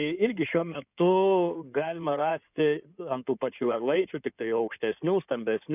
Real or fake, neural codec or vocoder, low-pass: fake; codec, 16 kHz in and 24 kHz out, 2.2 kbps, FireRedTTS-2 codec; 3.6 kHz